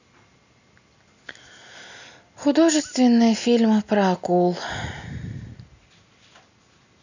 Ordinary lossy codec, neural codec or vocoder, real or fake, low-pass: none; none; real; 7.2 kHz